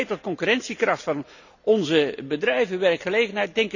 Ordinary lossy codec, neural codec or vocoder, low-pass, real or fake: none; none; 7.2 kHz; real